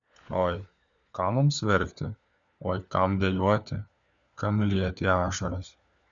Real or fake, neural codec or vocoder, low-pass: fake; codec, 16 kHz, 4 kbps, FunCodec, trained on LibriTTS, 50 frames a second; 7.2 kHz